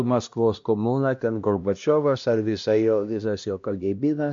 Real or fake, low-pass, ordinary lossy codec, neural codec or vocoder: fake; 7.2 kHz; MP3, 48 kbps; codec, 16 kHz, 1 kbps, X-Codec, HuBERT features, trained on LibriSpeech